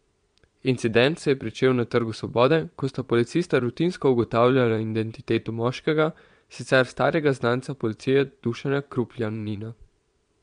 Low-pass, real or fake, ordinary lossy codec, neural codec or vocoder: 9.9 kHz; fake; MP3, 64 kbps; vocoder, 22.05 kHz, 80 mel bands, Vocos